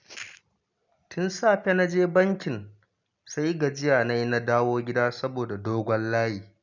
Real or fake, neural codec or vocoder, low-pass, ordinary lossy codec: real; none; 7.2 kHz; none